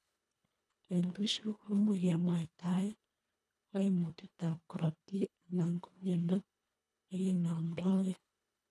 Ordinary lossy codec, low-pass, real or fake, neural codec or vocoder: none; none; fake; codec, 24 kHz, 1.5 kbps, HILCodec